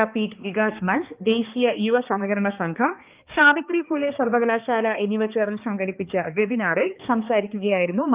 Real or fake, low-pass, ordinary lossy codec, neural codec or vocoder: fake; 3.6 kHz; Opus, 24 kbps; codec, 16 kHz, 2 kbps, X-Codec, HuBERT features, trained on balanced general audio